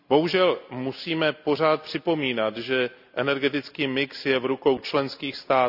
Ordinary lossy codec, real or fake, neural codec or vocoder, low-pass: none; real; none; 5.4 kHz